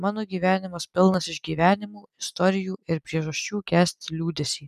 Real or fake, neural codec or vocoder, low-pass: real; none; 14.4 kHz